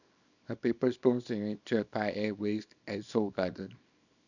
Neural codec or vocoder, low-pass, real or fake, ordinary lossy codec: codec, 24 kHz, 0.9 kbps, WavTokenizer, small release; 7.2 kHz; fake; none